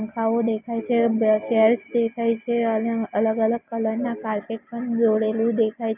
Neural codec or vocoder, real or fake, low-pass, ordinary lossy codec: none; real; 3.6 kHz; none